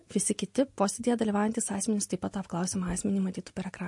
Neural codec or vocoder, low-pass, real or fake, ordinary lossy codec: none; 10.8 kHz; real; MP3, 48 kbps